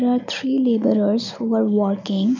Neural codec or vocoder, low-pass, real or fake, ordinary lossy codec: none; 7.2 kHz; real; none